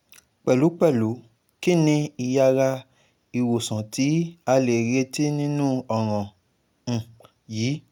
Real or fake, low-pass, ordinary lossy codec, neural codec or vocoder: real; 19.8 kHz; none; none